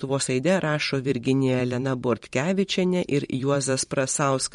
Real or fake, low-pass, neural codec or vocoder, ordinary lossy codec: fake; 19.8 kHz; vocoder, 44.1 kHz, 128 mel bands every 512 samples, BigVGAN v2; MP3, 48 kbps